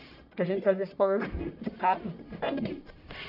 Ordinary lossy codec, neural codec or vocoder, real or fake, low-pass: none; codec, 44.1 kHz, 1.7 kbps, Pupu-Codec; fake; 5.4 kHz